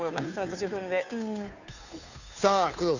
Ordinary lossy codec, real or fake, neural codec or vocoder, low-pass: none; fake; codec, 16 kHz, 2 kbps, FunCodec, trained on Chinese and English, 25 frames a second; 7.2 kHz